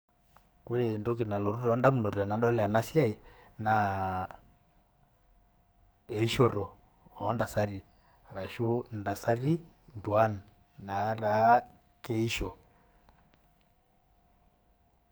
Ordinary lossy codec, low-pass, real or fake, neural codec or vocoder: none; none; fake; codec, 44.1 kHz, 2.6 kbps, SNAC